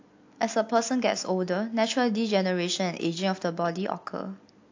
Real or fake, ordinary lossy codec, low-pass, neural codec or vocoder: real; AAC, 48 kbps; 7.2 kHz; none